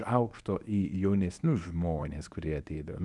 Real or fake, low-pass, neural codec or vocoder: fake; 10.8 kHz; codec, 24 kHz, 0.9 kbps, WavTokenizer, medium speech release version 1